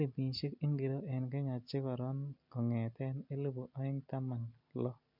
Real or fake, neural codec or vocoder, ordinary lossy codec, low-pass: real; none; MP3, 48 kbps; 5.4 kHz